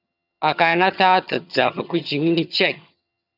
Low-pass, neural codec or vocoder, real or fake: 5.4 kHz; vocoder, 22.05 kHz, 80 mel bands, HiFi-GAN; fake